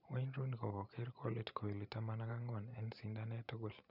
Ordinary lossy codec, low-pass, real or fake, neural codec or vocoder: none; 5.4 kHz; fake; vocoder, 44.1 kHz, 128 mel bands every 512 samples, BigVGAN v2